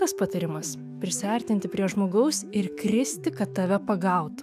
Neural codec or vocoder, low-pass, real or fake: autoencoder, 48 kHz, 128 numbers a frame, DAC-VAE, trained on Japanese speech; 14.4 kHz; fake